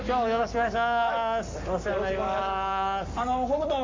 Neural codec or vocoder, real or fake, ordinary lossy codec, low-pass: codec, 44.1 kHz, 7.8 kbps, Pupu-Codec; fake; MP3, 48 kbps; 7.2 kHz